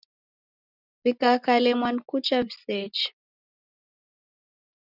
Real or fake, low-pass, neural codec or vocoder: real; 5.4 kHz; none